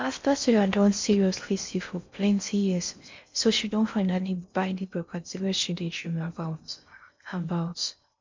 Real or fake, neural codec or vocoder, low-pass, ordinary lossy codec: fake; codec, 16 kHz in and 24 kHz out, 0.6 kbps, FocalCodec, streaming, 4096 codes; 7.2 kHz; MP3, 64 kbps